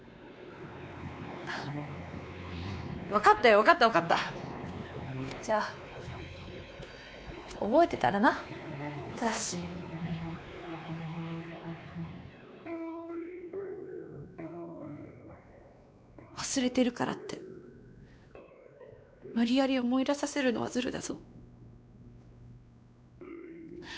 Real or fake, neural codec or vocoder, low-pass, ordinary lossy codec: fake; codec, 16 kHz, 2 kbps, X-Codec, WavLM features, trained on Multilingual LibriSpeech; none; none